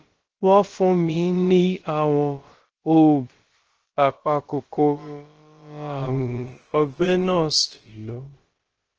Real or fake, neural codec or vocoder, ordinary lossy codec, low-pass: fake; codec, 16 kHz, about 1 kbps, DyCAST, with the encoder's durations; Opus, 16 kbps; 7.2 kHz